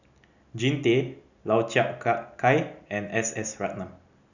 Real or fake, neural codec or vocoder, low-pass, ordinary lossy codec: real; none; 7.2 kHz; none